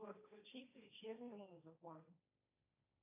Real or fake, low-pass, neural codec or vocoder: fake; 3.6 kHz; codec, 16 kHz, 1.1 kbps, Voila-Tokenizer